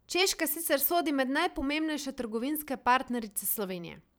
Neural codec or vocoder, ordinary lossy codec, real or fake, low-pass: vocoder, 44.1 kHz, 128 mel bands every 512 samples, BigVGAN v2; none; fake; none